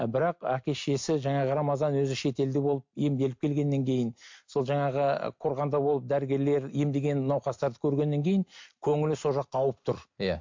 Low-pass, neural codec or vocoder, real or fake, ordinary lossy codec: 7.2 kHz; none; real; MP3, 48 kbps